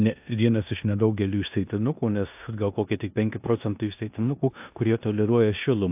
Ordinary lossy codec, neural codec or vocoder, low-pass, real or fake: AAC, 32 kbps; codec, 16 kHz in and 24 kHz out, 0.9 kbps, LongCat-Audio-Codec, four codebook decoder; 3.6 kHz; fake